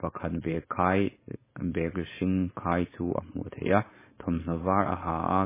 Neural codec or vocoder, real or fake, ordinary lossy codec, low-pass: codec, 16 kHz, 4.8 kbps, FACodec; fake; MP3, 16 kbps; 3.6 kHz